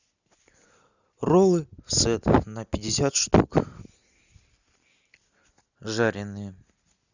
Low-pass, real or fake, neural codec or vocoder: 7.2 kHz; real; none